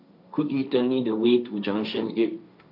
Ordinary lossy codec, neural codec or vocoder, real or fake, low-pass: none; codec, 16 kHz, 1.1 kbps, Voila-Tokenizer; fake; 5.4 kHz